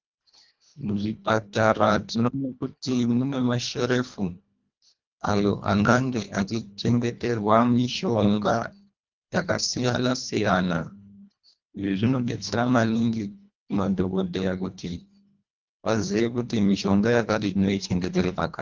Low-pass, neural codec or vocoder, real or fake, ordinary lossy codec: 7.2 kHz; codec, 24 kHz, 1.5 kbps, HILCodec; fake; Opus, 24 kbps